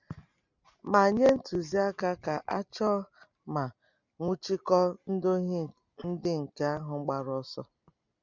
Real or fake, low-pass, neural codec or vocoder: real; 7.2 kHz; none